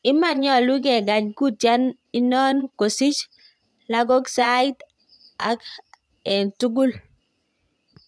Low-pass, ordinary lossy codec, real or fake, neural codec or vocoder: none; none; fake; vocoder, 22.05 kHz, 80 mel bands, Vocos